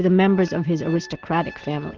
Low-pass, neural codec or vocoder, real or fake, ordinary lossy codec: 7.2 kHz; none; real; Opus, 16 kbps